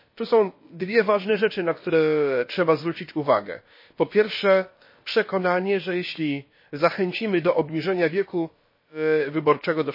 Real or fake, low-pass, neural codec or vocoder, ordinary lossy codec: fake; 5.4 kHz; codec, 16 kHz, about 1 kbps, DyCAST, with the encoder's durations; MP3, 24 kbps